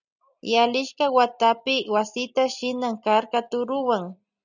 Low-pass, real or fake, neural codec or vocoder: 7.2 kHz; real; none